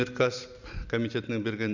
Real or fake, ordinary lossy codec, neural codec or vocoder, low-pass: real; none; none; 7.2 kHz